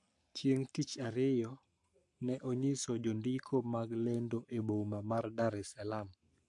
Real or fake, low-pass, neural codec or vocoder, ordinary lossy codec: fake; 10.8 kHz; codec, 44.1 kHz, 7.8 kbps, Pupu-Codec; AAC, 64 kbps